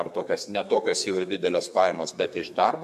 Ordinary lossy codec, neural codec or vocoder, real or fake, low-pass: MP3, 96 kbps; codec, 32 kHz, 1.9 kbps, SNAC; fake; 14.4 kHz